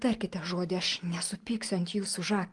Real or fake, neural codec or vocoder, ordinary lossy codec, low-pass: real; none; Opus, 24 kbps; 10.8 kHz